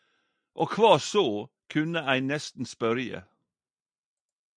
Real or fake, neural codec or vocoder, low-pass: real; none; 9.9 kHz